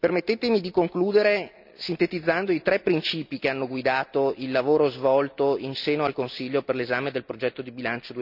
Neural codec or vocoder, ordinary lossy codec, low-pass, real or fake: none; none; 5.4 kHz; real